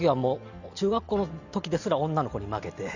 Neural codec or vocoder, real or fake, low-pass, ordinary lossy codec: none; real; 7.2 kHz; Opus, 64 kbps